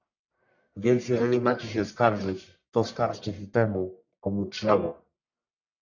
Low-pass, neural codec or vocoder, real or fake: 7.2 kHz; codec, 44.1 kHz, 1.7 kbps, Pupu-Codec; fake